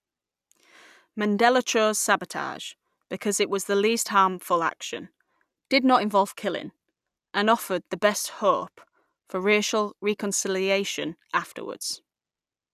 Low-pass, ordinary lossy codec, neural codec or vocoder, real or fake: 14.4 kHz; none; none; real